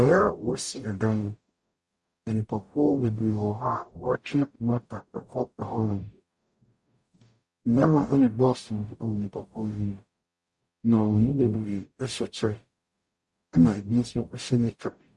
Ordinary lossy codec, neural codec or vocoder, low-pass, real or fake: Opus, 64 kbps; codec, 44.1 kHz, 0.9 kbps, DAC; 10.8 kHz; fake